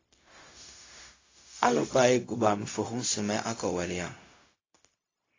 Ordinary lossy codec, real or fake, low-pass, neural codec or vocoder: AAC, 32 kbps; fake; 7.2 kHz; codec, 16 kHz, 0.4 kbps, LongCat-Audio-Codec